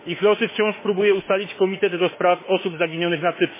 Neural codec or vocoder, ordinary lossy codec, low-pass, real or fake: autoencoder, 48 kHz, 32 numbers a frame, DAC-VAE, trained on Japanese speech; MP3, 16 kbps; 3.6 kHz; fake